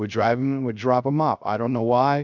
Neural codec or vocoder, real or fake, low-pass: codec, 16 kHz, 0.3 kbps, FocalCodec; fake; 7.2 kHz